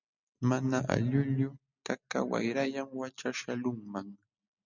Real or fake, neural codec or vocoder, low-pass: real; none; 7.2 kHz